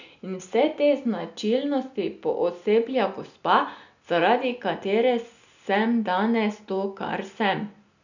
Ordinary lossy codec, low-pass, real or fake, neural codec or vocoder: none; 7.2 kHz; real; none